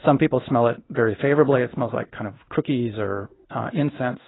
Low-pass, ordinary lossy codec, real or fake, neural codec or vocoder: 7.2 kHz; AAC, 16 kbps; fake; codec, 16 kHz in and 24 kHz out, 1 kbps, XY-Tokenizer